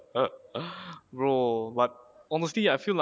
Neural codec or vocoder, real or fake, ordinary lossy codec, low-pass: none; real; none; none